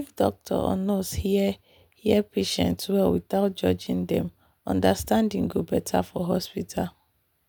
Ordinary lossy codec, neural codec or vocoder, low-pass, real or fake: none; none; none; real